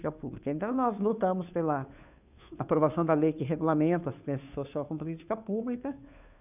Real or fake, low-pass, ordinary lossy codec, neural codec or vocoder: fake; 3.6 kHz; none; codec, 16 kHz, 2 kbps, FunCodec, trained on Chinese and English, 25 frames a second